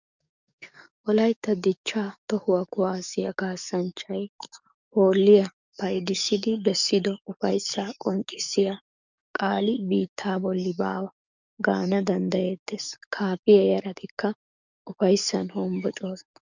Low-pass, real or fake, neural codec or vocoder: 7.2 kHz; fake; codec, 44.1 kHz, 7.8 kbps, DAC